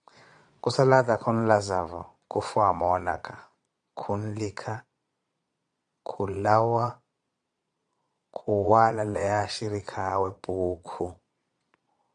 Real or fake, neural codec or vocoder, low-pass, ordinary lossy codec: fake; vocoder, 22.05 kHz, 80 mel bands, Vocos; 9.9 kHz; AAC, 64 kbps